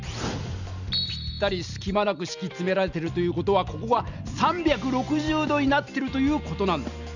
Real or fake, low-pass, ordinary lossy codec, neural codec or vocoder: real; 7.2 kHz; none; none